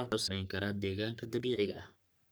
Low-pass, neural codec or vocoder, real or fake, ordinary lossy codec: none; codec, 44.1 kHz, 3.4 kbps, Pupu-Codec; fake; none